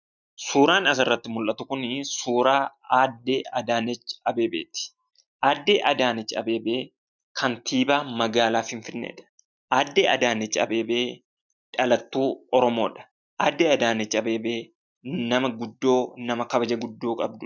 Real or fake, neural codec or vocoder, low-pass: real; none; 7.2 kHz